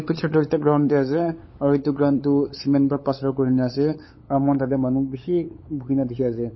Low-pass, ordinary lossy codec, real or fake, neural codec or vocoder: 7.2 kHz; MP3, 24 kbps; fake; codec, 16 kHz, 8 kbps, FunCodec, trained on LibriTTS, 25 frames a second